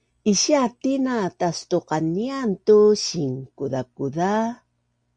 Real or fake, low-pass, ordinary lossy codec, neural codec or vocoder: real; 9.9 kHz; Opus, 64 kbps; none